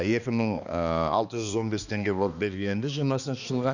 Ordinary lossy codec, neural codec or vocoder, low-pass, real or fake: none; codec, 16 kHz, 2 kbps, X-Codec, HuBERT features, trained on balanced general audio; 7.2 kHz; fake